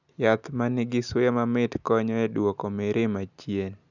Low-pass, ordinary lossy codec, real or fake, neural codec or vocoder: 7.2 kHz; none; real; none